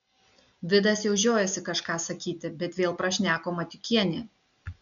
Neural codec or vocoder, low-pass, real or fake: none; 7.2 kHz; real